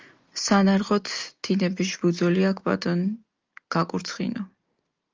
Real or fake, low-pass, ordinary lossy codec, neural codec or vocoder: real; 7.2 kHz; Opus, 32 kbps; none